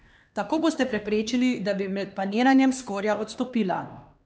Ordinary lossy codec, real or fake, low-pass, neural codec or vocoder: none; fake; none; codec, 16 kHz, 2 kbps, X-Codec, HuBERT features, trained on LibriSpeech